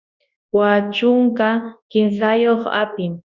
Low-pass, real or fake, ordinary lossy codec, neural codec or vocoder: 7.2 kHz; fake; Opus, 64 kbps; codec, 24 kHz, 0.9 kbps, WavTokenizer, large speech release